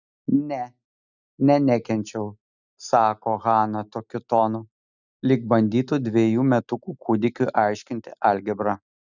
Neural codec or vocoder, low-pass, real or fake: none; 7.2 kHz; real